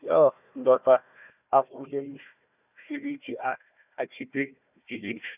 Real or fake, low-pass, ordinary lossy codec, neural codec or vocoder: fake; 3.6 kHz; none; codec, 16 kHz, 1 kbps, FunCodec, trained on Chinese and English, 50 frames a second